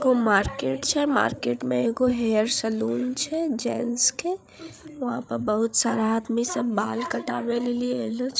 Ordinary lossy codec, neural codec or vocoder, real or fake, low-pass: none; codec, 16 kHz, 8 kbps, FreqCodec, larger model; fake; none